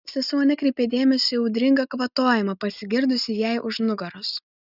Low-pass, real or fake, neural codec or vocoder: 5.4 kHz; real; none